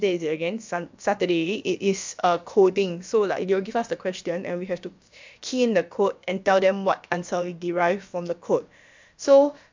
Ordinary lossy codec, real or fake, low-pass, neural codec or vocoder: MP3, 64 kbps; fake; 7.2 kHz; codec, 16 kHz, about 1 kbps, DyCAST, with the encoder's durations